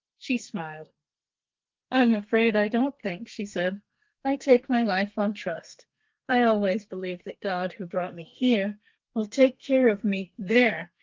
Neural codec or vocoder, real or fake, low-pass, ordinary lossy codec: codec, 32 kHz, 1.9 kbps, SNAC; fake; 7.2 kHz; Opus, 24 kbps